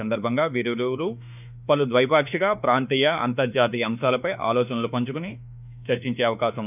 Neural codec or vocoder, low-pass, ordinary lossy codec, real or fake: autoencoder, 48 kHz, 32 numbers a frame, DAC-VAE, trained on Japanese speech; 3.6 kHz; none; fake